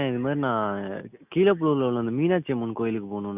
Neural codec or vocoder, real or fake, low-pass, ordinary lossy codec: none; real; 3.6 kHz; none